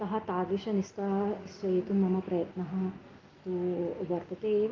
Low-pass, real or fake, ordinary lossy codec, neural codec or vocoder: 7.2 kHz; real; Opus, 24 kbps; none